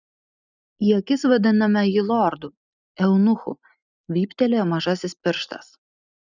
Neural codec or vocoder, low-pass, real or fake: none; 7.2 kHz; real